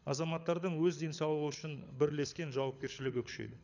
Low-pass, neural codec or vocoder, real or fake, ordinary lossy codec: 7.2 kHz; codec, 24 kHz, 6 kbps, HILCodec; fake; none